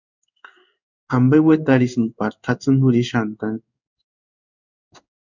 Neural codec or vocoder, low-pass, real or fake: codec, 16 kHz in and 24 kHz out, 1 kbps, XY-Tokenizer; 7.2 kHz; fake